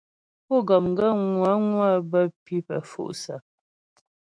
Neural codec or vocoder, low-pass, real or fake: autoencoder, 48 kHz, 128 numbers a frame, DAC-VAE, trained on Japanese speech; 9.9 kHz; fake